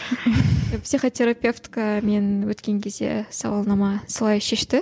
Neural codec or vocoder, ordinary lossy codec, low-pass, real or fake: none; none; none; real